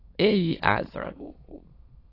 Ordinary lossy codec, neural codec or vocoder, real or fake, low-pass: AAC, 24 kbps; autoencoder, 22.05 kHz, a latent of 192 numbers a frame, VITS, trained on many speakers; fake; 5.4 kHz